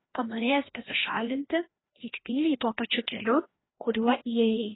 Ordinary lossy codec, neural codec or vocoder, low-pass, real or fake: AAC, 16 kbps; codec, 16 kHz, 1 kbps, FreqCodec, larger model; 7.2 kHz; fake